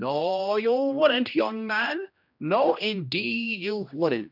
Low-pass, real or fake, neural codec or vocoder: 5.4 kHz; fake; codec, 16 kHz, 1 kbps, X-Codec, HuBERT features, trained on general audio